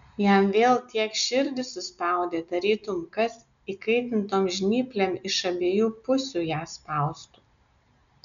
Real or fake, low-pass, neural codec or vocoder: real; 7.2 kHz; none